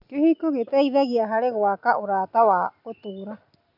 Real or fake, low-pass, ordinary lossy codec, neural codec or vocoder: real; 5.4 kHz; none; none